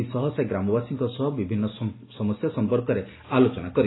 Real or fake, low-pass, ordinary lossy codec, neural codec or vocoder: real; 7.2 kHz; AAC, 16 kbps; none